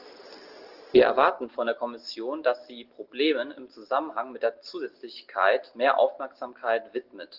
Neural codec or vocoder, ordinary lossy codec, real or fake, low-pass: none; Opus, 16 kbps; real; 5.4 kHz